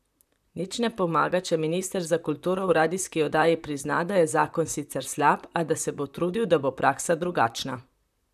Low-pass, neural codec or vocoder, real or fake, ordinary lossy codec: 14.4 kHz; vocoder, 44.1 kHz, 128 mel bands, Pupu-Vocoder; fake; none